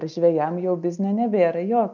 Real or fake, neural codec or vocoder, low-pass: real; none; 7.2 kHz